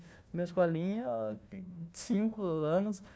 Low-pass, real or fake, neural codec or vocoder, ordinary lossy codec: none; fake; codec, 16 kHz, 1 kbps, FunCodec, trained on Chinese and English, 50 frames a second; none